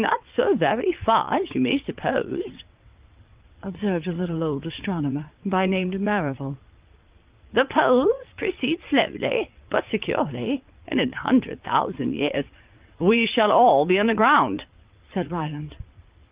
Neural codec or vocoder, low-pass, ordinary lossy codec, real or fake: vocoder, 22.05 kHz, 80 mel bands, WaveNeXt; 3.6 kHz; Opus, 24 kbps; fake